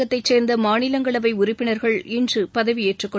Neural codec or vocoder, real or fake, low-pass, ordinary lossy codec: none; real; none; none